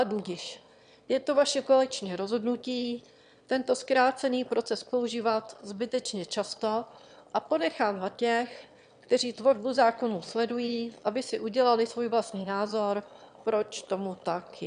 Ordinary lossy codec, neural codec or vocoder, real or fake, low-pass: MP3, 96 kbps; autoencoder, 22.05 kHz, a latent of 192 numbers a frame, VITS, trained on one speaker; fake; 9.9 kHz